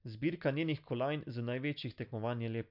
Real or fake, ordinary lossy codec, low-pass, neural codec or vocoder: real; MP3, 48 kbps; 5.4 kHz; none